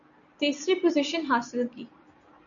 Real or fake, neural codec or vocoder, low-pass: real; none; 7.2 kHz